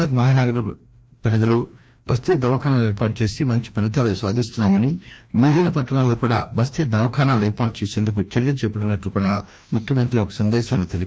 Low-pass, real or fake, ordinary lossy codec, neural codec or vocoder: none; fake; none; codec, 16 kHz, 1 kbps, FreqCodec, larger model